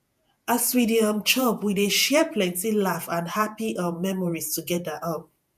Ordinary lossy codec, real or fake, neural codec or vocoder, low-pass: none; fake; vocoder, 48 kHz, 128 mel bands, Vocos; 14.4 kHz